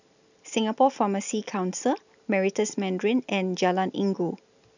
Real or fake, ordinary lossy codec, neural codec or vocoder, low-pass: real; none; none; 7.2 kHz